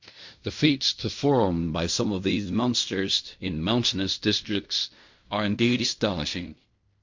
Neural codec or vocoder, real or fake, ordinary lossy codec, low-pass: codec, 16 kHz in and 24 kHz out, 0.4 kbps, LongCat-Audio-Codec, fine tuned four codebook decoder; fake; MP3, 48 kbps; 7.2 kHz